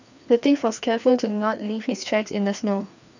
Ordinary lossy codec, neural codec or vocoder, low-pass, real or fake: none; codec, 16 kHz, 2 kbps, FreqCodec, larger model; 7.2 kHz; fake